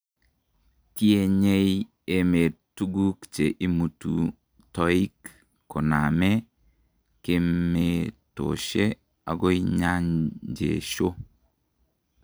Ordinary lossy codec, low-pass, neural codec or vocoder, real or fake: none; none; none; real